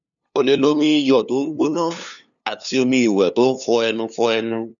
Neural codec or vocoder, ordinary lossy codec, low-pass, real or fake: codec, 16 kHz, 2 kbps, FunCodec, trained on LibriTTS, 25 frames a second; none; 7.2 kHz; fake